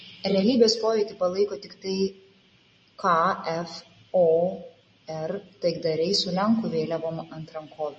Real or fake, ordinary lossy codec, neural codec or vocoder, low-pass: real; MP3, 32 kbps; none; 9.9 kHz